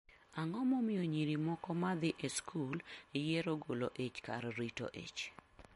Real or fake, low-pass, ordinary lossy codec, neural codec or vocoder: real; 19.8 kHz; MP3, 48 kbps; none